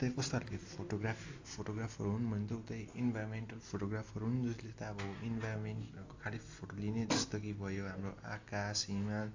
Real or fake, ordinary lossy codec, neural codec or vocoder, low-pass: real; AAC, 48 kbps; none; 7.2 kHz